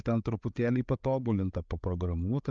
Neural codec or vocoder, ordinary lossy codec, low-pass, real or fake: codec, 16 kHz, 8 kbps, FreqCodec, larger model; Opus, 24 kbps; 7.2 kHz; fake